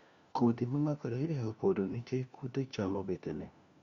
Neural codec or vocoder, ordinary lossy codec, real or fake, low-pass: codec, 16 kHz, 1 kbps, FunCodec, trained on LibriTTS, 50 frames a second; Opus, 64 kbps; fake; 7.2 kHz